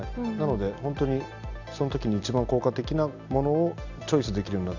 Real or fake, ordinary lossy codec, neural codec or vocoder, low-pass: real; none; none; 7.2 kHz